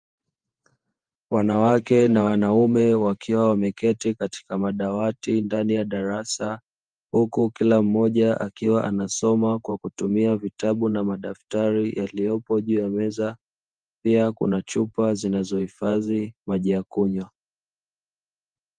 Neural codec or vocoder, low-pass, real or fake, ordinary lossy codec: vocoder, 48 kHz, 128 mel bands, Vocos; 9.9 kHz; fake; Opus, 32 kbps